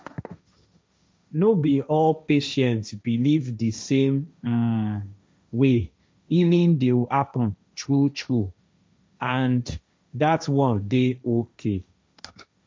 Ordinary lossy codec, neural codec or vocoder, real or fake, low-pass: none; codec, 16 kHz, 1.1 kbps, Voila-Tokenizer; fake; none